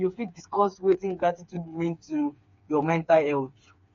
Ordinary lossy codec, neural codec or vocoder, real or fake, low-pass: MP3, 48 kbps; codec, 16 kHz, 4 kbps, FreqCodec, smaller model; fake; 7.2 kHz